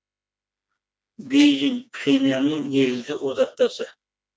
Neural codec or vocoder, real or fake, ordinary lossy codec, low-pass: codec, 16 kHz, 2 kbps, FreqCodec, smaller model; fake; none; none